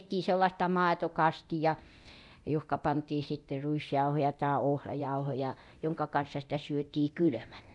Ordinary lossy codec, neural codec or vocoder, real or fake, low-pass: none; codec, 24 kHz, 0.9 kbps, DualCodec; fake; 10.8 kHz